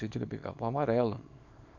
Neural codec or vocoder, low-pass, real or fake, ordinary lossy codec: codec, 24 kHz, 0.9 kbps, WavTokenizer, small release; 7.2 kHz; fake; none